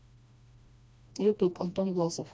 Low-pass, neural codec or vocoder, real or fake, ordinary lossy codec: none; codec, 16 kHz, 1 kbps, FreqCodec, smaller model; fake; none